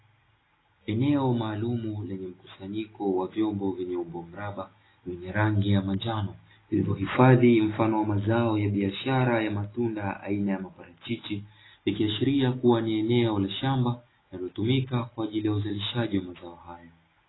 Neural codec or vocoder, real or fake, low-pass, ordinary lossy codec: none; real; 7.2 kHz; AAC, 16 kbps